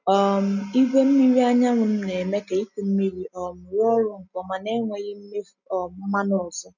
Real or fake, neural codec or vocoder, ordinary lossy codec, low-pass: real; none; none; 7.2 kHz